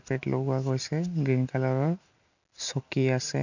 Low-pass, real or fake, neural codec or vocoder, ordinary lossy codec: 7.2 kHz; real; none; none